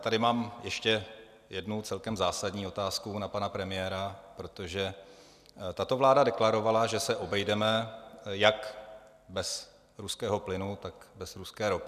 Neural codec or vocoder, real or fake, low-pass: none; real; 14.4 kHz